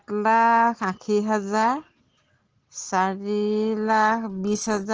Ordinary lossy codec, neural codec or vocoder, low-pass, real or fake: Opus, 16 kbps; none; 7.2 kHz; real